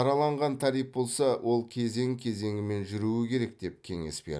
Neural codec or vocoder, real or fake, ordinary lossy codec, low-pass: none; real; none; none